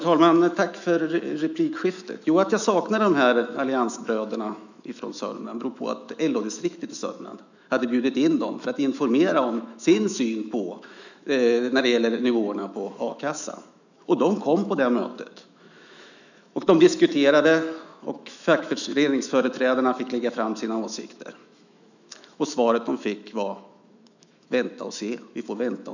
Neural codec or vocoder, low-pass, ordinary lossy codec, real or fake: autoencoder, 48 kHz, 128 numbers a frame, DAC-VAE, trained on Japanese speech; 7.2 kHz; none; fake